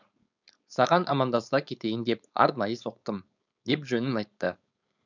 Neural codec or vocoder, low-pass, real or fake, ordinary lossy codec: codec, 16 kHz, 4.8 kbps, FACodec; 7.2 kHz; fake; none